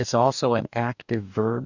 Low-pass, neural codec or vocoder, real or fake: 7.2 kHz; codec, 44.1 kHz, 2.6 kbps, DAC; fake